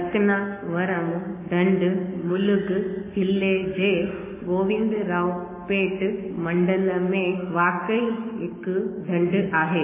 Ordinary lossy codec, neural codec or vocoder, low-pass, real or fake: MP3, 16 kbps; codec, 44.1 kHz, 7.8 kbps, Pupu-Codec; 3.6 kHz; fake